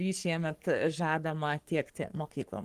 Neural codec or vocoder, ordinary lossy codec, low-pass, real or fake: codec, 44.1 kHz, 3.4 kbps, Pupu-Codec; Opus, 24 kbps; 14.4 kHz; fake